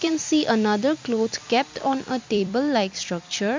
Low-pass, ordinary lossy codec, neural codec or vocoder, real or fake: 7.2 kHz; MP3, 64 kbps; vocoder, 44.1 kHz, 128 mel bands every 256 samples, BigVGAN v2; fake